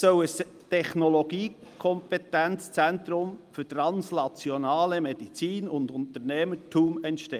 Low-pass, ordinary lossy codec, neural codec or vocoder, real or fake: 14.4 kHz; Opus, 32 kbps; none; real